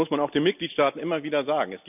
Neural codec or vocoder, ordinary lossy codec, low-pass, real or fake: none; none; 3.6 kHz; real